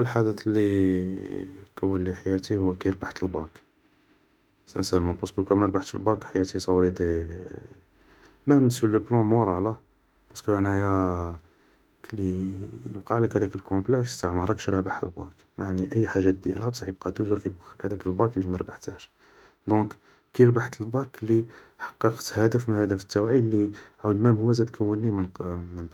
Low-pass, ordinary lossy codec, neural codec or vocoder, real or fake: 19.8 kHz; none; autoencoder, 48 kHz, 32 numbers a frame, DAC-VAE, trained on Japanese speech; fake